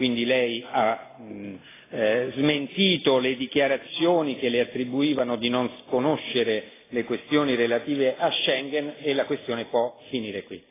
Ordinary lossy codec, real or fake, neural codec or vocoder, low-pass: AAC, 16 kbps; real; none; 3.6 kHz